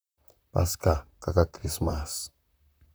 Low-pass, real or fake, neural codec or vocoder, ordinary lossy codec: none; fake; vocoder, 44.1 kHz, 128 mel bands, Pupu-Vocoder; none